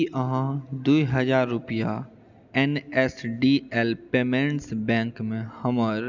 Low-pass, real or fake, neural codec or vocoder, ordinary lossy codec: 7.2 kHz; real; none; none